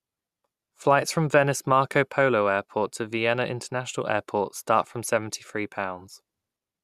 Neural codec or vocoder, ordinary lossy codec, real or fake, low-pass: none; none; real; 14.4 kHz